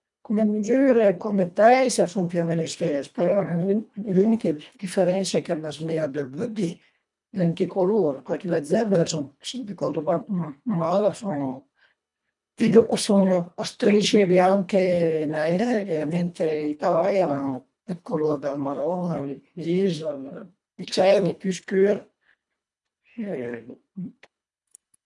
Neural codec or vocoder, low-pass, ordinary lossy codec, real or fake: codec, 24 kHz, 1.5 kbps, HILCodec; 10.8 kHz; none; fake